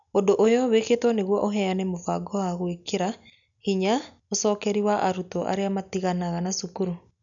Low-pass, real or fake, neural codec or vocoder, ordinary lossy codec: 7.2 kHz; real; none; none